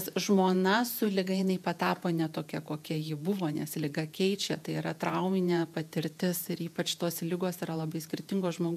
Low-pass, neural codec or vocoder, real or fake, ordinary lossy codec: 14.4 kHz; vocoder, 48 kHz, 128 mel bands, Vocos; fake; MP3, 96 kbps